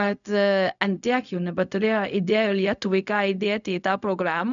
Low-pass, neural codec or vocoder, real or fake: 7.2 kHz; codec, 16 kHz, 0.4 kbps, LongCat-Audio-Codec; fake